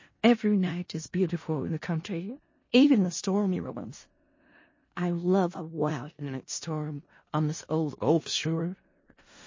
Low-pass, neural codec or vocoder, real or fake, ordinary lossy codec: 7.2 kHz; codec, 16 kHz in and 24 kHz out, 0.4 kbps, LongCat-Audio-Codec, four codebook decoder; fake; MP3, 32 kbps